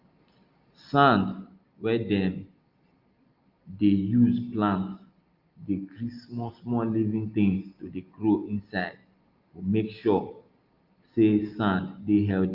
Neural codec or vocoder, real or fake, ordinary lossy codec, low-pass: none; real; Opus, 32 kbps; 5.4 kHz